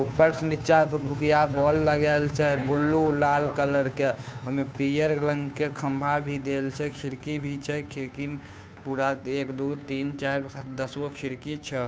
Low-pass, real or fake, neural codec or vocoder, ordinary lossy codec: none; fake; codec, 16 kHz, 2 kbps, FunCodec, trained on Chinese and English, 25 frames a second; none